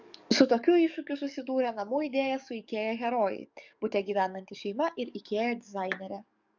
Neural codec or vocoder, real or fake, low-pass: codec, 44.1 kHz, 7.8 kbps, DAC; fake; 7.2 kHz